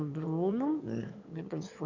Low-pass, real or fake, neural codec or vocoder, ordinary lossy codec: 7.2 kHz; fake; autoencoder, 22.05 kHz, a latent of 192 numbers a frame, VITS, trained on one speaker; none